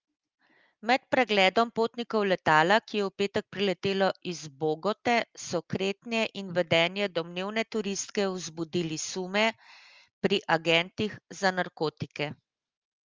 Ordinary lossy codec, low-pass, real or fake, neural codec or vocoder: Opus, 32 kbps; 7.2 kHz; real; none